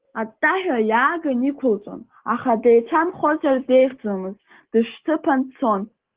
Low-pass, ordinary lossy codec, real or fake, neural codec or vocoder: 3.6 kHz; Opus, 16 kbps; real; none